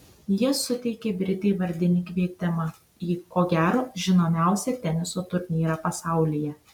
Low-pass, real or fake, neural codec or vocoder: 19.8 kHz; real; none